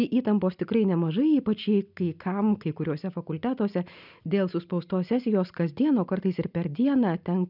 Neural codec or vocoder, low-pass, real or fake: none; 5.4 kHz; real